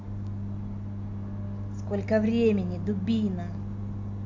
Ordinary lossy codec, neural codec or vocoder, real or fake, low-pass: AAC, 48 kbps; none; real; 7.2 kHz